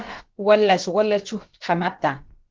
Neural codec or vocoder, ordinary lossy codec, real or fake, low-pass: codec, 16 kHz, about 1 kbps, DyCAST, with the encoder's durations; Opus, 16 kbps; fake; 7.2 kHz